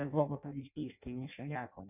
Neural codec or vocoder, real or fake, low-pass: codec, 16 kHz in and 24 kHz out, 0.6 kbps, FireRedTTS-2 codec; fake; 3.6 kHz